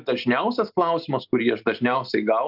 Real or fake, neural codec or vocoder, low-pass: real; none; 5.4 kHz